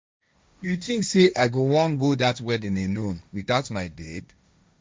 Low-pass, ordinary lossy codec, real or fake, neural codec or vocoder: none; none; fake; codec, 16 kHz, 1.1 kbps, Voila-Tokenizer